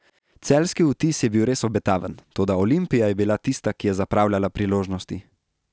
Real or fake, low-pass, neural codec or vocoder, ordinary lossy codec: real; none; none; none